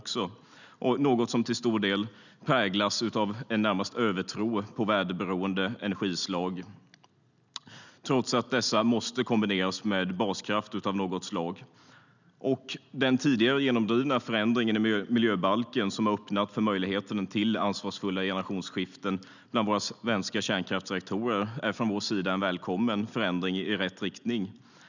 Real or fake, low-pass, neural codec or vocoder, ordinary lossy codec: real; 7.2 kHz; none; none